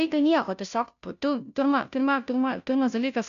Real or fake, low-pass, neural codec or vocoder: fake; 7.2 kHz; codec, 16 kHz, 0.5 kbps, FunCodec, trained on Chinese and English, 25 frames a second